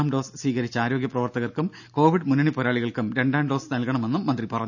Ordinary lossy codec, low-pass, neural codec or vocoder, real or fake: none; 7.2 kHz; none; real